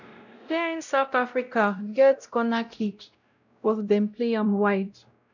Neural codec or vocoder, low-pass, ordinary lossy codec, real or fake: codec, 16 kHz, 0.5 kbps, X-Codec, WavLM features, trained on Multilingual LibriSpeech; 7.2 kHz; none; fake